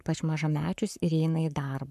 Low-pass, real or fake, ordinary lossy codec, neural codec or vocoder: 14.4 kHz; fake; MP3, 96 kbps; codec, 44.1 kHz, 7.8 kbps, Pupu-Codec